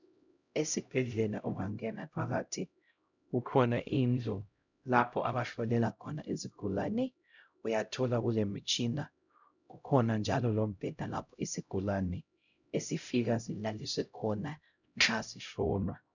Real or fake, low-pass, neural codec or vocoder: fake; 7.2 kHz; codec, 16 kHz, 0.5 kbps, X-Codec, HuBERT features, trained on LibriSpeech